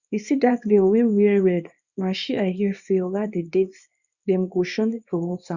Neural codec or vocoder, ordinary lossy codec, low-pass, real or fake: codec, 24 kHz, 0.9 kbps, WavTokenizer, small release; Opus, 64 kbps; 7.2 kHz; fake